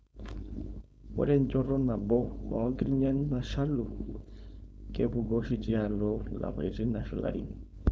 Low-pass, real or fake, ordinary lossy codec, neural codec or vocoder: none; fake; none; codec, 16 kHz, 4.8 kbps, FACodec